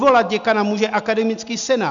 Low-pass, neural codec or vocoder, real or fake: 7.2 kHz; none; real